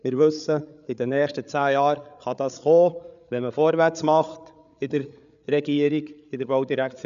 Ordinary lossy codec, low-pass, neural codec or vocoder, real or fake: none; 7.2 kHz; codec, 16 kHz, 8 kbps, FreqCodec, larger model; fake